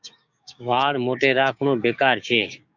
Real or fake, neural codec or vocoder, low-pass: fake; codec, 16 kHz, 6 kbps, DAC; 7.2 kHz